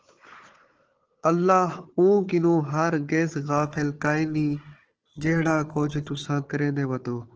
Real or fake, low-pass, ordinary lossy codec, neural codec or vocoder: fake; 7.2 kHz; Opus, 16 kbps; codec, 16 kHz, 4 kbps, FunCodec, trained on Chinese and English, 50 frames a second